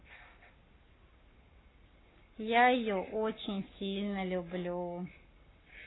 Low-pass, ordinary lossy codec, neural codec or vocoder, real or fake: 7.2 kHz; AAC, 16 kbps; codec, 44.1 kHz, 7.8 kbps, Pupu-Codec; fake